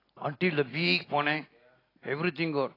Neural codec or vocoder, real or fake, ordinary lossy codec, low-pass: none; real; AAC, 24 kbps; 5.4 kHz